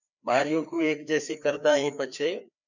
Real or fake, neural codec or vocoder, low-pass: fake; codec, 16 kHz, 2 kbps, FreqCodec, larger model; 7.2 kHz